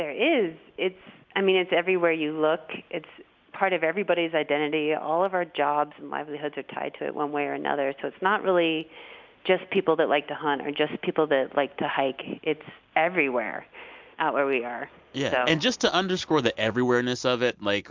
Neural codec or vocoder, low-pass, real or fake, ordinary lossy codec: none; 7.2 kHz; real; MP3, 64 kbps